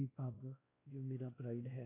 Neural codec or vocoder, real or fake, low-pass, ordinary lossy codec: codec, 16 kHz, 1 kbps, X-Codec, WavLM features, trained on Multilingual LibriSpeech; fake; 3.6 kHz; AAC, 16 kbps